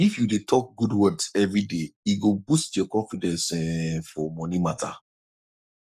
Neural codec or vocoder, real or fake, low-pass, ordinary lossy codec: codec, 44.1 kHz, 7.8 kbps, Pupu-Codec; fake; 14.4 kHz; none